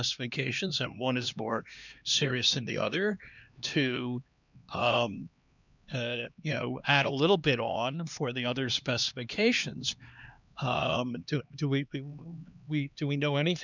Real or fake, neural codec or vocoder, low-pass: fake; codec, 16 kHz, 2 kbps, X-Codec, HuBERT features, trained on LibriSpeech; 7.2 kHz